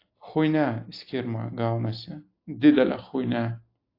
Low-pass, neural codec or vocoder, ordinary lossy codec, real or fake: 5.4 kHz; none; AAC, 32 kbps; real